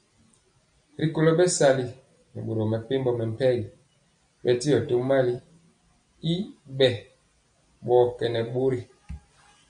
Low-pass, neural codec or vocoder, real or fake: 9.9 kHz; none; real